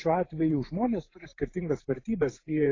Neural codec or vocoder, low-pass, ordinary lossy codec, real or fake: vocoder, 44.1 kHz, 128 mel bands every 512 samples, BigVGAN v2; 7.2 kHz; AAC, 32 kbps; fake